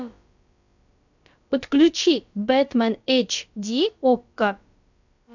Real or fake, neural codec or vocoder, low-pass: fake; codec, 16 kHz, about 1 kbps, DyCAST, with the encoder's durations; 7.2 kHz